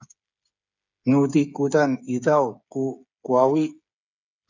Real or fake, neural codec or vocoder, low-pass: fake; codec, 16 kHz, 8 kbps, FreqCodec, smaller model; 7.2 kHz